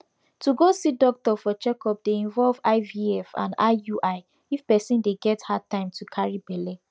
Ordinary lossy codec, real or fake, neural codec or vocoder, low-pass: none; real; none; none